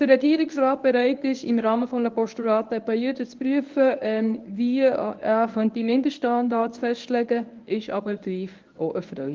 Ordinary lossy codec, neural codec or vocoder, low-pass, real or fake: Opus, 32 kbps; codec, 24 kHz, 0.9 kbps, WavTokenizer, medium speech release version 1; 7.2 kHz; fake